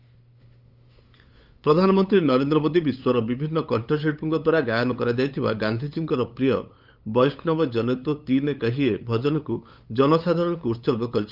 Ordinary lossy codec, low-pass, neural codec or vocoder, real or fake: Opus, 24 kbps; 5.4 kHz; codec, 16 kHz, 8 kbps, FunCodec, trained on LibriTTS, 25 frames a second; fake